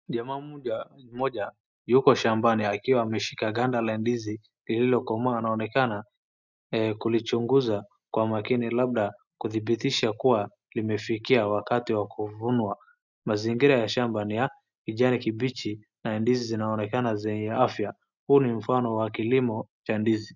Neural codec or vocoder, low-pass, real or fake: none; 7.2 kHz; real